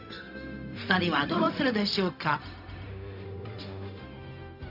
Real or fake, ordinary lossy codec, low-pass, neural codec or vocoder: fake; none; 5.4 kHz; codec, 16 kHz, 0.4 kbps, LongCat-Audio-Codec